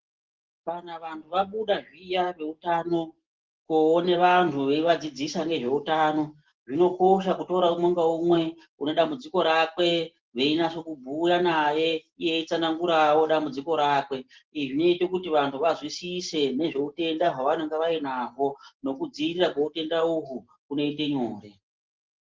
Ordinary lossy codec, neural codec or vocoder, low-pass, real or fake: Opus, 16 kbps; none; 7.2 kHz; real